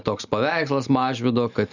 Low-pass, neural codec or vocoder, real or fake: 7.2 kHz; none; real